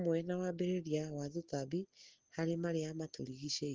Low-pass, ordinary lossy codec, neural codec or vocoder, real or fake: 7.2 kHz; Opus, 16 kbps; none; real